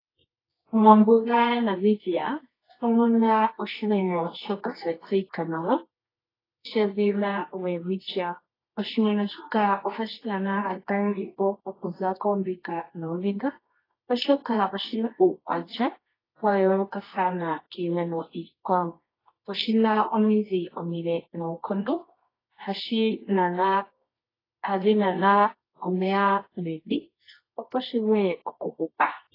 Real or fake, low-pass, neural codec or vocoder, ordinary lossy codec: fake; 5.4 kHz; codec, 24 kHz, 0.9 kbps, WavTokenizer, medium music audio release; AAC, 24 kbps